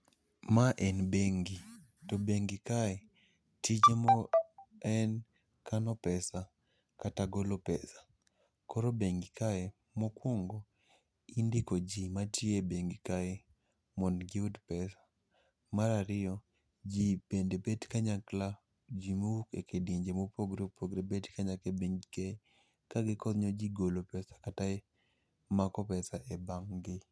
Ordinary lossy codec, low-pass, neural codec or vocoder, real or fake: none; none; none; real